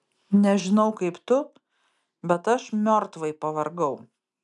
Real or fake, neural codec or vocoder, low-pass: real; none; 10.8 kHz